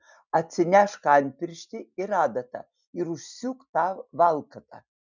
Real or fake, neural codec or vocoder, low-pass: real; none; 7.2 kHz